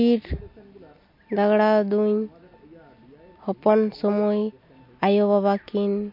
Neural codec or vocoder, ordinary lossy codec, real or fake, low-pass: none; MP3, 32 kbps; real; 5.4 kHz